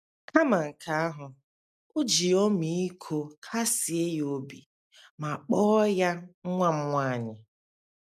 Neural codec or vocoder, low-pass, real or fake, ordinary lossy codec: none; 14.4 kHz; real; none